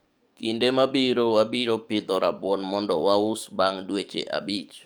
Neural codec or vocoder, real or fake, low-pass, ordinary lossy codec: codec, 44.1 kHz, 7.8 kbps, DAC; fake; none; none